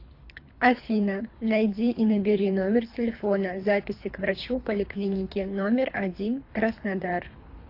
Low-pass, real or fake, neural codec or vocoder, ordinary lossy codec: 5.4 kHz; fake; codec, 24 kHz, 3 kbps, HILCodec; AAC, 32 kbps